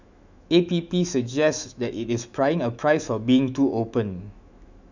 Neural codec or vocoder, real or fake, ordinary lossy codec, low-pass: autoencoder, 48 kHz, 128 numbers a frame, DAC-VAE, trained on Japanese speech; fake; none; 7.2 kHz